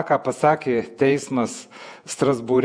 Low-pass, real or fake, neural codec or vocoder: 9.9 kHz; fake; vocoder, 48 kHz, 128 mel bands, Vocos